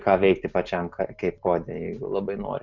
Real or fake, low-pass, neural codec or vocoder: real; 7.2 kHz; none